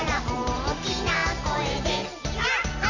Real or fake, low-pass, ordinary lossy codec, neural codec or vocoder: real; 7.2 kHz; none; none